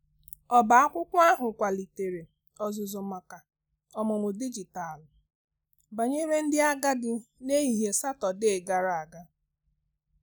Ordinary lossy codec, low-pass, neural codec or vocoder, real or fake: none; none; none; real